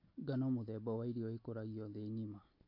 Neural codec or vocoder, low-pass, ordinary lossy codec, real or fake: none; 5.4 kHz; MP3, 32 kbps; real